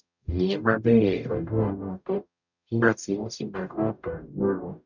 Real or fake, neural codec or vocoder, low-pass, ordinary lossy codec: fake; codec, 44.1 kHz, 0.9 kbps, DAC; 7.2 kHz; none